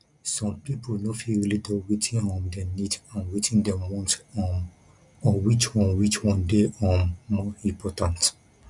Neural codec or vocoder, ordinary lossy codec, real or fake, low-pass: none; none; real; 10.8 kHz